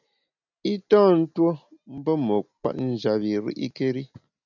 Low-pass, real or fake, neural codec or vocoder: 7.2 kHz; real; none